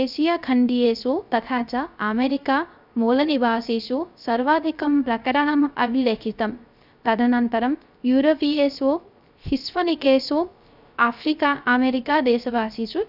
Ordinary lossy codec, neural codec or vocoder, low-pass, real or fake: none; codec, 16 kHz, 0.3 kbps, FocalCodec; 5.4 kHz; fake